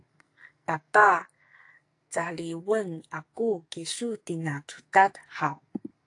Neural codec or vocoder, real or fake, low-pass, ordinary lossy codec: codec, 44.1 kHz, 2.6 kbps, SNAC; fake; 10.8 kHz; AAC, 48 kbps